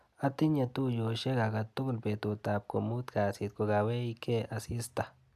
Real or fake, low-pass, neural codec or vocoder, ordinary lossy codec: real; 14.4 kHz; none; AAC, 96 kbps